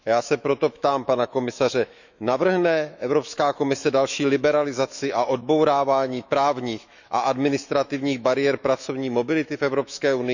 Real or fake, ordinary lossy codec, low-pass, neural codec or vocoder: fake; none; 7.2 kHz; autoencoder, 48 kHz, 128 numbers a frame, DAC-VAE, trained on Japanese speech